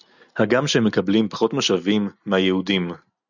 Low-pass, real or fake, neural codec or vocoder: 7.2 kHz; real; none